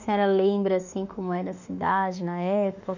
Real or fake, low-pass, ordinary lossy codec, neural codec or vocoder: fake; 7.2 kHz; none; autoencoder, 48 kHz, 32 numbers a frame, DAC-VAE, trained on Japanese speech